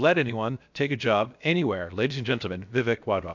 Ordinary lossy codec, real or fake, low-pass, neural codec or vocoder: MP3, 64 kbps; fake; 7.2 kHz; codec, 16 kHz, about 1 kbps, DyCAST, with the encoder's durations